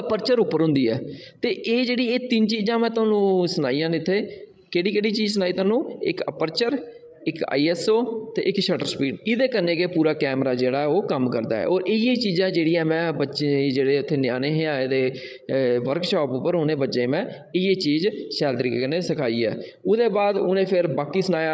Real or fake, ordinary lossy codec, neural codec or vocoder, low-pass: fake; none; codec, 16 kHz, 16 kbps, FreqCodec, larger model; none